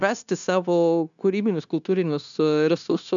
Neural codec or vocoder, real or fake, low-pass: codec, 16 kHz, 0.9 kbps, LongCat-Audio-Codec; fake; 7.2 kHz